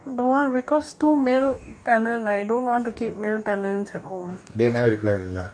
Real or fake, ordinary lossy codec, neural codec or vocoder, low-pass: fake; none; codec, 44.1 kHz, 2.6 kbps, DAC; 9.9 kHz